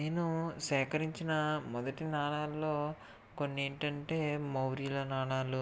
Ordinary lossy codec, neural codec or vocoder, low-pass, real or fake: none; none; none; real